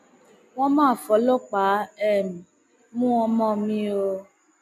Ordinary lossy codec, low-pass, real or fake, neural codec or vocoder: none; 14.4 kHz; real; none